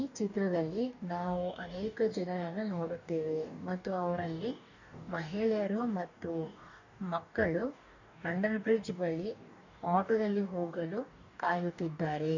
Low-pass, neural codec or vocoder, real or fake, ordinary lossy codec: 7.2 kHz; codec, 44.1 kHz, 2.6 kbps, DAC; fake; none